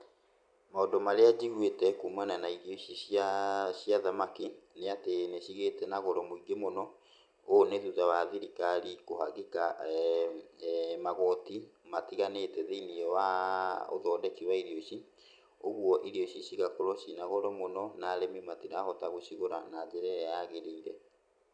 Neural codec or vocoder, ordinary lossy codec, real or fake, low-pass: none; none; real; 9.9 kHz